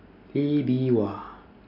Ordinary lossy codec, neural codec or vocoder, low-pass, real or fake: AAC, 48 kbps; none; 5.4 kHz; real